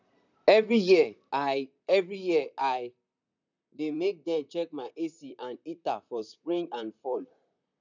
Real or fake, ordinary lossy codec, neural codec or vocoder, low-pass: fake; none; vocoder, 44.1 kHz, 128 mel bands, Pupu-Vocoder; 7.2 kHz